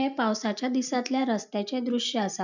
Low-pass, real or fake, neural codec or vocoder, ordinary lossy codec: 7.2 kHz; real; none; none